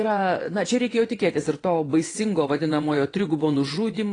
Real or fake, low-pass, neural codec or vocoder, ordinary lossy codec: fake; 9.9 kHz; vocoder, 22.05 kHz, 80 mel bands, WaveNeXt; AAC, 32 kbps